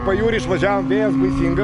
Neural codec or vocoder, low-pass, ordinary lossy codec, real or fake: none; 10.8 kHz; AAC, 96 kbps; real